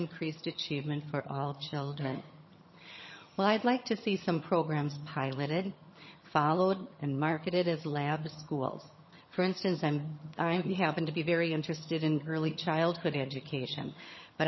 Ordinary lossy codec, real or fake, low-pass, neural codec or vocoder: MP3, 24 kbps; fake; 7.2 kHz; vocoder, 22.05 kHz, 80 mel bands, HiFi-GAN